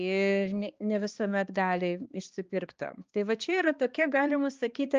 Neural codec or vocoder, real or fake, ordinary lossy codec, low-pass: codec, 16 kHz, 2 kbps, X-Codec, HuBERT features, trained on balanced general audio; fake; Opus, 24 kbps; 7.2 kHz